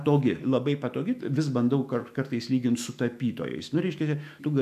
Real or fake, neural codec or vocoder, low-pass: fake; autoencoder, 48 kHz, 128 numbers a frame, DAC-VAE, trained on Japanese speech; 14.4 kHz